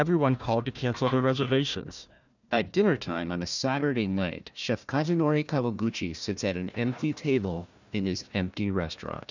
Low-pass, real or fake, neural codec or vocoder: 7.2 kHz; fake; codec, 16 kHz, 1 kbps, FunCodec, trained on Chinese and English, 50 frames a second